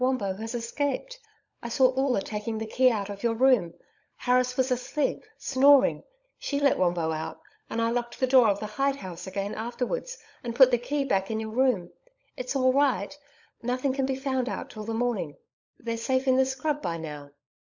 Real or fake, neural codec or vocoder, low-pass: fake; codec, 16 kHz, 16 kbps, FunCodec, trained on LibriTTS, 50 frames a second; 7.2 kHz